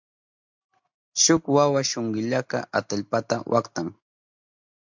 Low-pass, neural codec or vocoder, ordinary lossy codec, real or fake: 7.2 kHz; none; MP3, 64 kbps; real